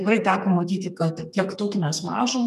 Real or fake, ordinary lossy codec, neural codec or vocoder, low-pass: fake; MP3, 96 kbps; codec, 32 kHz, 1.9 kbps, SNAC; 14.4 kHz